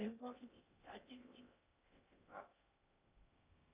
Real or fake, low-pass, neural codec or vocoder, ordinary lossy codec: fake; 3.6 kHz; codec, 16 kHz in and 24 kHz out, 0.6 kbps, FocalCodec, streaming, 2048 codes; Opus, 64 kbps